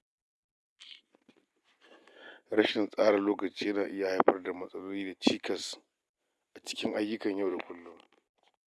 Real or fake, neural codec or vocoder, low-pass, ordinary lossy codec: real; none; none; none